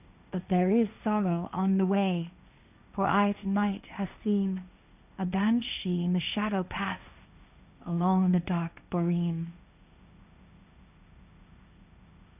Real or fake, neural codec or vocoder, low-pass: fake; codec, 16 kHz, 1.1 kbps, Voila-Tokenizer; 3.6 kHz